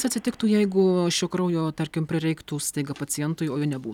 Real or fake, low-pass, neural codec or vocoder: fake; 19.8 kHz; vocoder, 44.1 kHz, 128 mel bands every 256 samples, BigVGAN v2